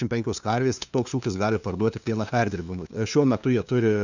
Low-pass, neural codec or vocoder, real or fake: 7.2 kHz; codec, 16 kHz, 2 kbps, FunCodec, trained on LibriTTS, 25 frames a second; fake